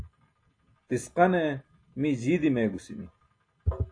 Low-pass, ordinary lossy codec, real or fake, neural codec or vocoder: 9.9 kHz; AAC, 48 kbps; real; none